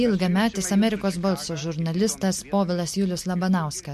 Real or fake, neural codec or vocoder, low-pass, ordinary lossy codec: real; none; 14.4 kHz; MP3, 64 kbps